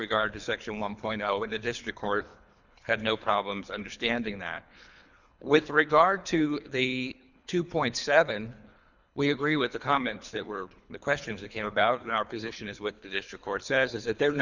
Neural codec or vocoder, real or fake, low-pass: codec, 24 kHz, 3 kbps, HILCodec; fake; 7.2 kHz